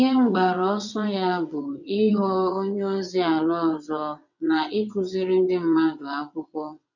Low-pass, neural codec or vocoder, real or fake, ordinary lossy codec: 7.2 kHz; vocoder, 22.05 kHz, 80 mel bands, WaveNeXt; fake; none